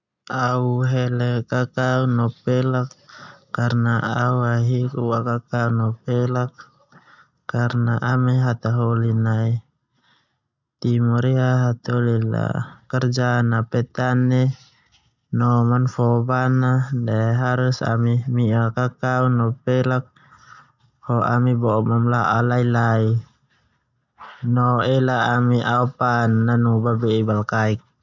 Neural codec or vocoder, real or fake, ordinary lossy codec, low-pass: none; real; none; 7.2 kHz